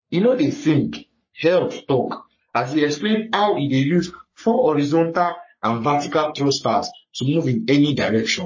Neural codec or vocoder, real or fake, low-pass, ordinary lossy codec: codec, 44.1 kHz, 3.4 kbps, Pupu-Codec; fake; 7.2 kHz; MP3, 32 kbps